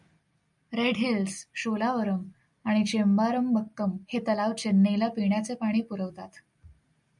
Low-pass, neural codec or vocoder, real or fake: 10.8 kHz; none; real